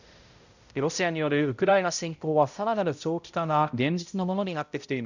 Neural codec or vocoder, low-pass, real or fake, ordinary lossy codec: codec, 16 kHz, 0.5 kbps, X-Codec, HuBERT features, trained on balanced general audio; 7.2 kHz; fake; none